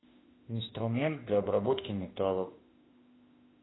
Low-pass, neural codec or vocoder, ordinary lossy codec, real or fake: 7.2 kHz; autoencoder, 48 kHz, 32 numbers a frame, DAC-VAE, trained on Japanese speech; AAC, 16 kbps; fake